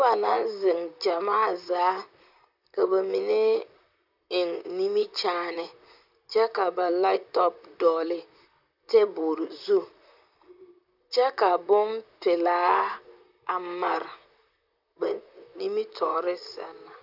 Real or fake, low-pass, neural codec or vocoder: fake; 5.4 kHz; vocoder, 44.1 kHz, 128 mel bands, Pupu-Vocoder